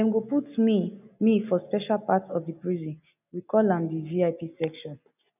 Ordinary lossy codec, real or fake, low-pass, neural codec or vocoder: none; real; 3.6 kHz; none